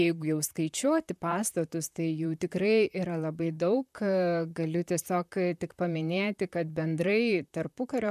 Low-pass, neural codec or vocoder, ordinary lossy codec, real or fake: 14.4 kHz; vocoder, 44.1 kHz, 128 mel bands, Pupu-Vocoder; MP3, 96 kbps; fake